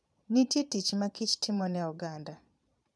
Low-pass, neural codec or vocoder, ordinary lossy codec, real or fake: none; vocoder, 22.05 kHz, 80 mel bands, Vocos; none; fake